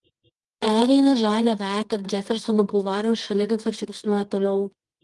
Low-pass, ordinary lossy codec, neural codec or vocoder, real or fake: 10.8 kHz; Opus, 24 kbps; codec, 24 kHz, 0.9 kbps, WavTokenizer, medium music audio release; fake